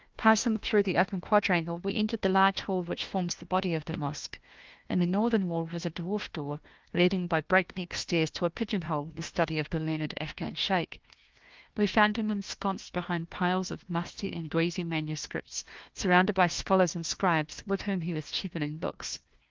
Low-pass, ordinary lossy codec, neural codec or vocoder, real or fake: 7.2 kHz; Opus, 32 kbps; codec, 16 kHz, 1 kbps, FunCodec, trained on Chinese and English, 50 frames a second; fake